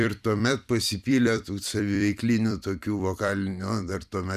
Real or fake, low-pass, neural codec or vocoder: fake; 14.4 kHz; vocoder, 44.1 kHz, 128 mel bands every 256 samples, BigVGAN v2